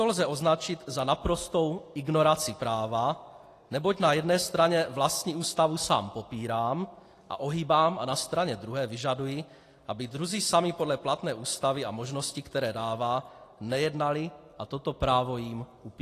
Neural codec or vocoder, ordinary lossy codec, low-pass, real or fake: none; AAC, 48 kbps; 14.4 kHz; real